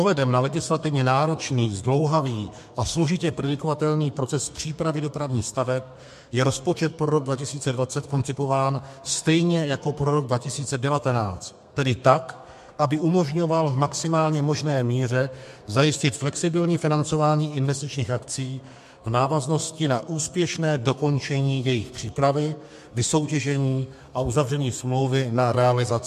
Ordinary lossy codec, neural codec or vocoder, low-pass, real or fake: MP3, 64 kbps; codec, 32 kHz, 1.9 kbps, SNAC; 14.4 kHz; fake